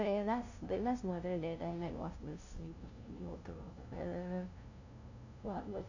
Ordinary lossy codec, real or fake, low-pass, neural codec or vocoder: none; fake; 7.2 kHz; codec, 16 kHz, 0.5 kbps, FunCodec, trained on LibriTTS, 25 frames a second